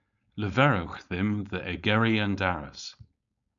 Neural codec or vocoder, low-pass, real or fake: codec, 16 kHz, 4.8 kbps, FACodec; 7.2 kHz; fake